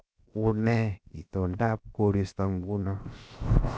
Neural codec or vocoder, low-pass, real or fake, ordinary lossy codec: codec, 16 kHz, 0.7 kbps, FocalCodec; none; fake; none